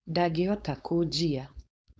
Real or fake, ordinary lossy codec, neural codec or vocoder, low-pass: fake; none; codec, 16 kHz, 4.8 kbps, FACodec; none